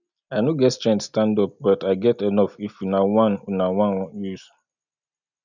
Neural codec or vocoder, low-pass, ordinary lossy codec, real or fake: none; 7.2 kHz; none; real